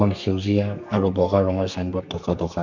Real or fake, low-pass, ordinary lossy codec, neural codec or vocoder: fake; 7.2 kHz; none; codec, 32 kHz, 1.9 kbps, SNAC